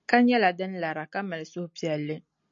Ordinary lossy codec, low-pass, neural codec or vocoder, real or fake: MP3, 48 kbps; 7.2 kHz; none; real